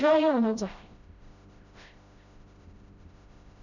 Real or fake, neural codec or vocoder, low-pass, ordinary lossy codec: fake; codec, 16 kHz, 0.5 kbps, FreqCodec, smaller model; 7.2 kHz; none